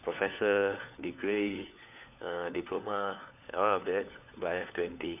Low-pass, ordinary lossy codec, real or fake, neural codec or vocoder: 3.6 kHz; none; fake; codec, 16 kHz, 4 kbps, FunCodec, trained on LibriTTS, 50 frames a second